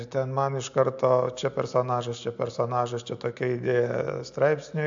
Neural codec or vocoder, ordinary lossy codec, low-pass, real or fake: none; AAC, 64 kbps; 7.2 kHz; real